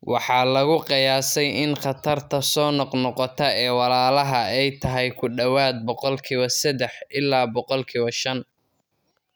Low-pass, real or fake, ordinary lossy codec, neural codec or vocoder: none; real; none; none